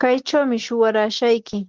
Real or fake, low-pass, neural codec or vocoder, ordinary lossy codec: real; 7.2 kHz; none; Opus, 16 kbps